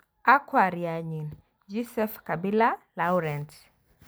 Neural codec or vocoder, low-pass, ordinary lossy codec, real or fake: none; none; none; real